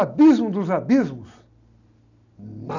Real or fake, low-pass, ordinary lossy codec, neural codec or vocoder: real; 7.2 kHz; none; none